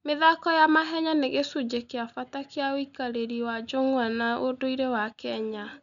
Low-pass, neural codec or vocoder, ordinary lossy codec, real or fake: 7.2 kHz; none; none; real